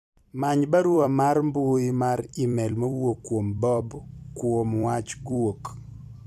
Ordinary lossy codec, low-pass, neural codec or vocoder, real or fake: none; 14.4 kHz; vocoder, 44.1 kHz, 128 mel bands every 256 samples, BigVGAN v2; fake